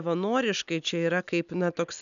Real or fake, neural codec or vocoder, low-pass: real; none; 7.2 kHz